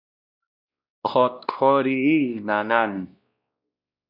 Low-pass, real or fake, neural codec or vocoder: 5.4 kHz; fake; codec, 16 kHz, 1 kbps, X-Codec, WavLM features, trained on Multilingual LibriSpeech